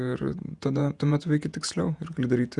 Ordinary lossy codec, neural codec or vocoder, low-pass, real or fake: Opus, 64 kbps; vocoder, 48 kHz, 128 mel bands, Vocos; 10.8 kHz; fake